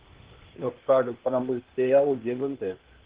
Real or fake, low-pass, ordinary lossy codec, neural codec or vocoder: fake; 3.6 kHz; Opus, 16 kbps; codec, 16 kHz, 0.8 kbps, ZipCodec